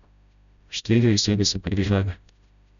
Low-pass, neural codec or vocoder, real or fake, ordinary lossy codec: 7.2 kHz; codec, 16 kHz, 0.5 kbps, FreqCodec, smaller model; fake; none